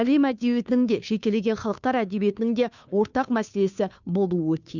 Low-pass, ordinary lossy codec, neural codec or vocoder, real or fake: 7.2 kHz; none; codec, 16 kHz, 2 kbps, FunCodec, trained on Chinese and English, 25 frames a second; fake